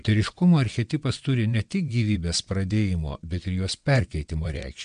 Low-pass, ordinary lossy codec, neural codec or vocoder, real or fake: 9.9 kHz; MP3, 64 kbps; vocoder, 22.05 kHz, 80 mel bands, WaveNeXt; fake